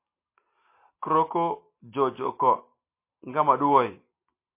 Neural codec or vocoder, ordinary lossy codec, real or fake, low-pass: none; MP3, 24 kbps; real; 3.6 kHz